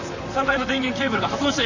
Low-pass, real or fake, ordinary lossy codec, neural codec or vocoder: 7.2 kHz; fake; none; vocoder, 44.1 kHz, 128 mel bands, Pupu-Vocoder